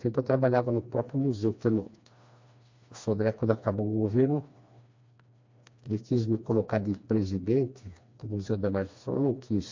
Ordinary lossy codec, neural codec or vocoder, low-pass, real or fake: MP3, 48 kbps; codec, 16 kHz, 2 kbps, FreqCodec, smaller model; 7.2 kHz; fake